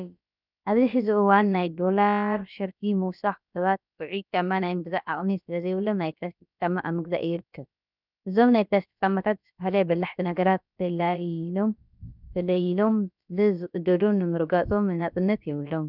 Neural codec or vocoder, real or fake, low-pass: codec, 16 kHz, about 1 kbps, DyCAST, with the encoder's durations; fake; 5.4 kHz